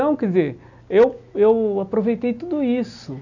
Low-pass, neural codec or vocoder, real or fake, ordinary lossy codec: 7.2 kHz; none; real; none